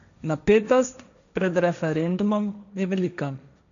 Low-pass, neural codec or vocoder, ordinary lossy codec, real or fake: 7.2 kHz; codec, 16 kHz, 1.1 kbps, Voila-Tokenizer; none; fake